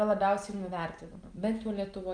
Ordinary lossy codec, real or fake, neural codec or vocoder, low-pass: Opus, 32 kbps; real; none; 9.9 kHz